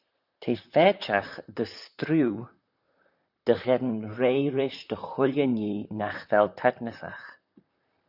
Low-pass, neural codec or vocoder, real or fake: 5.4 kHz; vocoder, 44.1 kHz, 128 mel bands, Pupu-Vocoder; fake